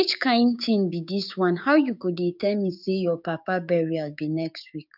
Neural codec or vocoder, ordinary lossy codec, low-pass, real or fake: codec, 16 kHz, 6 kbps, DAC; none; 5.4 kHz; fake